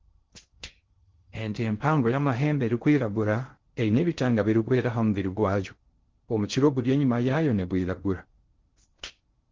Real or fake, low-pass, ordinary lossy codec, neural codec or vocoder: fake; 7.2 kHz; Opus, 16 kbps; codec, 16 kHz in and 24 kHz out, 0.6 kbps, FocalCodec, streaming, 4096 codes